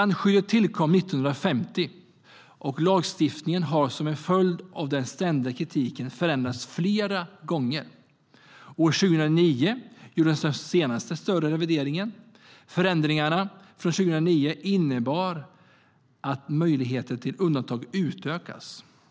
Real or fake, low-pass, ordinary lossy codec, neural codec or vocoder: real; none; none; none